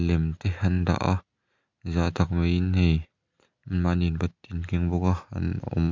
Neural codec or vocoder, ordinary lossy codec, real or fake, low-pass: none; MP3, 64 kbps; real; 7.2 kHz